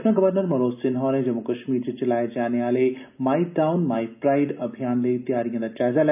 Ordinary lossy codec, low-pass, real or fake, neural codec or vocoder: none; 3.6 kHz; real; none